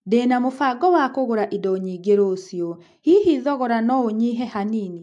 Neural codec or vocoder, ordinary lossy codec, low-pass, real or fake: none; MP3, 64 kbps; 10.8 kHz; real